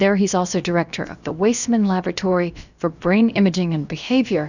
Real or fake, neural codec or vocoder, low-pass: fake; codec, 16 kHz, about 1 kbps, DyCAST, with the encoder's durations; 7.2 kHz